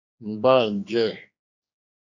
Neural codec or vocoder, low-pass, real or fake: codec, 16 kHz, 2 kbps, X-Codec, HuBERT features, trained on general audio; 7.2 kHz; fake